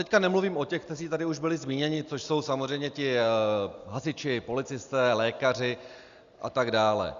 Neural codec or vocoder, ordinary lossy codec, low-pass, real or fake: none; Opus, 64 kbps; 7.2 kHz; real